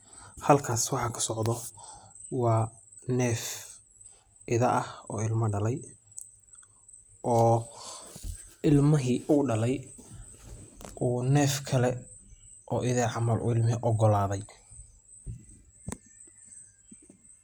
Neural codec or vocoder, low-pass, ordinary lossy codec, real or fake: none; none; none; real